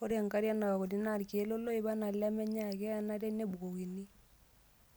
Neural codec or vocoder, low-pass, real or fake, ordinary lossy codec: none; none; real; none